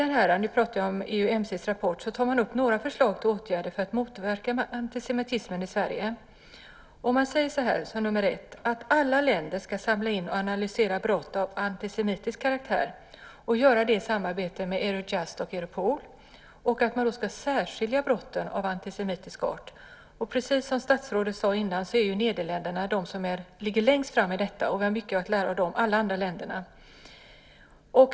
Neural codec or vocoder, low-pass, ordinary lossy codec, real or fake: none; none; none; real